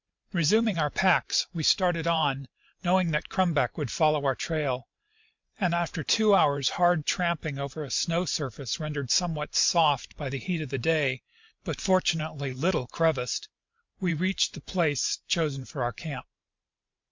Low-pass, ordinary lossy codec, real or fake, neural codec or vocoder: 7.2 kHz; MP3, 64 kbps; fake; vocoder, 44.1 kHz, 128 mel bands every 512 samples, BigVGAN v2